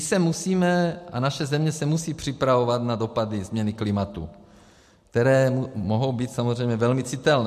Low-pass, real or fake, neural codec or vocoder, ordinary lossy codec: 14.4 kHz; real; none; MP3, 64 kbps